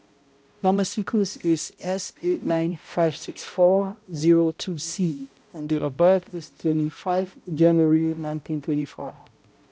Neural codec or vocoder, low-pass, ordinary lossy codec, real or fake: codec, 16 kHz, 0.5 kbps, X-Codec, HuBERT features, trained on balanced general audio; none; none; fake